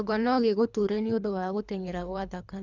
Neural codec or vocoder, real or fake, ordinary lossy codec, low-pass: codec, 24 kHz, 3 kbps, HILCodec; fake; none; 7.2 kHz